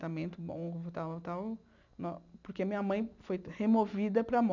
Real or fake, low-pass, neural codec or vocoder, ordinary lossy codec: real; 7.2 kHz; none; none